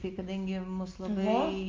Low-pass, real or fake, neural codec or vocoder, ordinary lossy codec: 7.2 kHz; real; none; Opus, 24 kbps